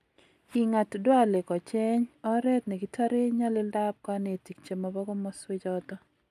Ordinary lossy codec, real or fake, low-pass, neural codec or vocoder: AAC, 96 kbps; real; 14.4 kHz; none